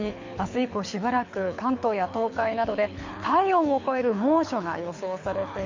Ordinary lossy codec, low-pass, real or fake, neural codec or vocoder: MP3, 48 kbps; 7.2 kHz; fake; codec, 24 kHz, 6 kbps, HILCodec